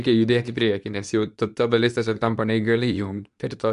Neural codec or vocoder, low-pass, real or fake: codec, 24 kHz, 0.9 kbps, WavTokenizer, medium speech release version 2; 10.8 kHz; fake